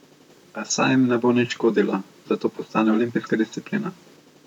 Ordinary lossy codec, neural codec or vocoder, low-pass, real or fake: none; vocoder, 44.1 kHz, 128 mel bands, Pupu-Vocoder; 19.8 kHz; fake